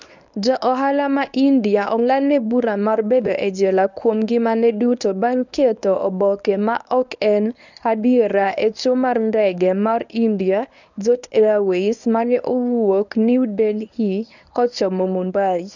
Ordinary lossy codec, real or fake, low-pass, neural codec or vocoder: none; fake; 7.2 kHz; codec, 24 kHz, 0.9 kbps, WavTokenizer, medium speech release version 1